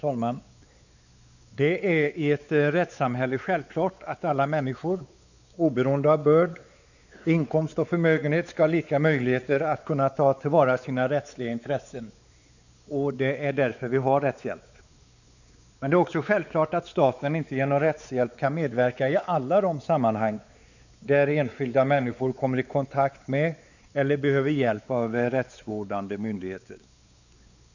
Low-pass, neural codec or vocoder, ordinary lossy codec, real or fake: 7.2 kHz; codec, 16 kHz, 4 kbps, X-Codec, WavLM features, trained on Multilingual LibriSpeech; none; fake